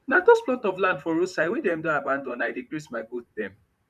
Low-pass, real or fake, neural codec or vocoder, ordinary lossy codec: 14.4 kHz; fake; vocoder, 44.1 kHz, 128 mel bands, Pupu-Vocoder; none